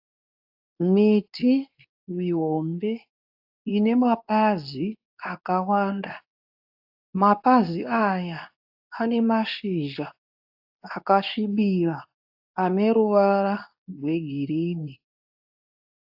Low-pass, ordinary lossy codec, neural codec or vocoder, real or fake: 5.4 kHz; AAC, 48 kbps; codec, 24 kHz, 0.9 kbps, WavTokenizer, medium speech release version 2; fake